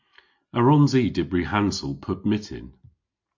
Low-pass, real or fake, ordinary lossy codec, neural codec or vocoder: 7.2 kHz; real; MP3, 48 kbps; none